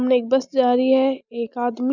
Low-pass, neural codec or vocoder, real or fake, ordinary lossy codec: 7.2 kHz; none; real; none